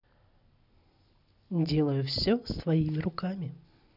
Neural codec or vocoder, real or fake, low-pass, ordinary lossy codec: none; real; 5.4 kHz; none